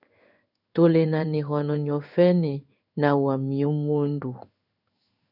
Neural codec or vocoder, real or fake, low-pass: codec, 16 kHz in and 24 kHz out, 1 kbps, XY-Tokenizer; fake; 5.4 kHz